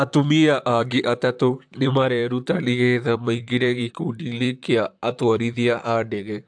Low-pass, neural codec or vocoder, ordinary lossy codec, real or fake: 9.9 kHz; vocoder, 44.1 kHz, 128 mel bands, Pupu-Vocoder; AAC, 64 kbps; fake